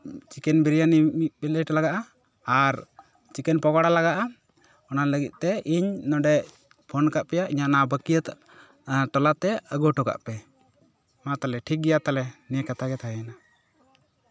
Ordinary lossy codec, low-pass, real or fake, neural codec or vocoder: none; none; real; none